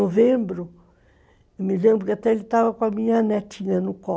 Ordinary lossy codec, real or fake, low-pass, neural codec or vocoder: none; real; none; none